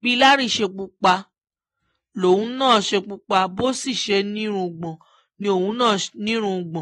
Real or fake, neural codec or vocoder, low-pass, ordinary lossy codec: real; none; 19.8 kHz; AAC, 32 kbps